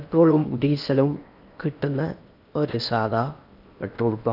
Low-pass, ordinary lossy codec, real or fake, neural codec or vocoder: 5.4 kHz; none; fake; codec, 16 kHz in and 24 kHz out, 0.8 kbps, FocalCodec, streaming, 65536 codes